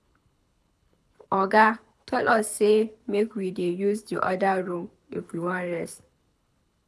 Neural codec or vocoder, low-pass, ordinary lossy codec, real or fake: codec, 24 kHz, 6 kbps, HILCodec; none; none; fake